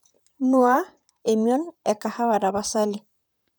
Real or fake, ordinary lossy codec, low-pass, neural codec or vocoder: fake; none; none; vocoder, 44.1 kHz, 128 mel bands, Pupu-Vocoder